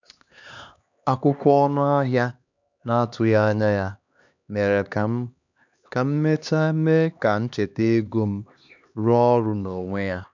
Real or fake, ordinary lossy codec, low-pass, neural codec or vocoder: fake; none; 7.2 kHz; codec, 16 kHz, 2 kbps, X-Codec, HuBERT features, trained on LibriSpeech